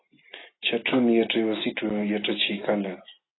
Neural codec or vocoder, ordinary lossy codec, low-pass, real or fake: none; AAC, 16 kbps; 7.2 kHz; real